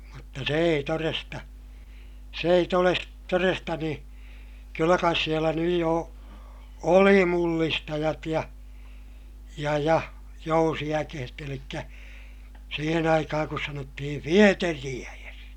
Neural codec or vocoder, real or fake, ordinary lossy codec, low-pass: none; real; none; 19.8 kHz